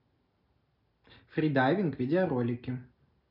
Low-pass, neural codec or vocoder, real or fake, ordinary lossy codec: 5.4 kHz; none; real; none